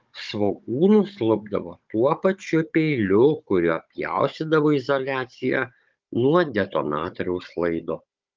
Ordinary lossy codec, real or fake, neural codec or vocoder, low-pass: Opus, 24 kbps; fake; codec, 16 kHz, 16 kbps, FunCodec, trained on Chinese and English, 50 frames a second; 7.2 kHz